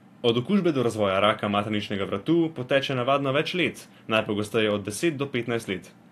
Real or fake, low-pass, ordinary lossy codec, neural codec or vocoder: real; 14.4 kHz; AAC, 64 kbps; none